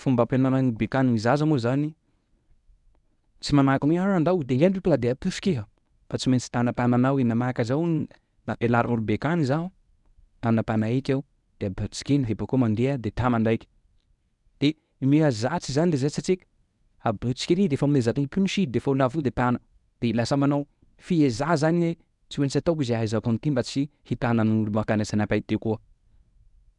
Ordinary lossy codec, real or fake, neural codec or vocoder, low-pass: none; fake; codec, 24 kHz, 0.9 kbps, WavTokenizer, medium speech release version 1; 10.8 kHz